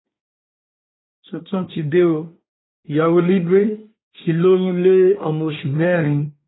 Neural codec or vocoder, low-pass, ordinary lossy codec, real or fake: codec, 24 kHz, 1 kbps, SNAC; 7.2 kHz; AAC, 16 kbps; fake